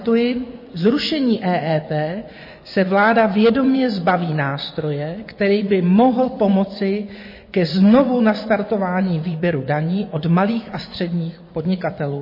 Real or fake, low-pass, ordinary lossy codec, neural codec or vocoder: fake; 5.4 kHz; MP3, 24 kbps; vocoder, 24 kHz, 100 mel bands, Vocos